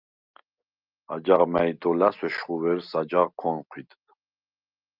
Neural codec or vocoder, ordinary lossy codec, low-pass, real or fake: none; Opus, 24 kbps; 5.4 kHz; real